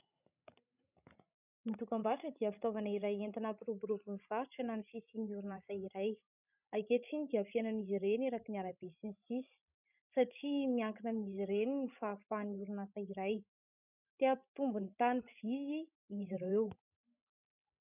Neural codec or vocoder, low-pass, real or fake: codec, 16 kHz, 16 kbps, FreqCodec, larger model; 3.6 kHz; fake